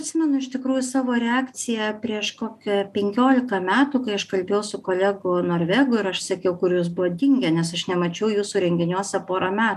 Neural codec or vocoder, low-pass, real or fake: none; 14.4 kHz; real